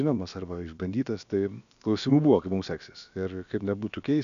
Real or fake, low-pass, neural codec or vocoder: fake; 7.2 kHz; codec, 16 kHz, about 1 kbps, DyCAST, with the encoder's durations